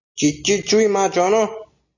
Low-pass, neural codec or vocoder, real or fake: 7.2 kHz; none; real